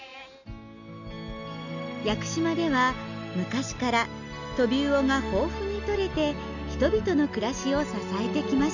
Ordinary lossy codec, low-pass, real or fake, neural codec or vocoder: none; 7.2 kHz; real; none